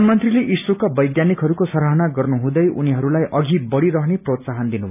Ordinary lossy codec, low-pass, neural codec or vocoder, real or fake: none; 3.6 kHz; none; real